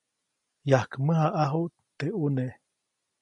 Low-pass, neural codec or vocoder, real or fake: 10.8 kHz; none; real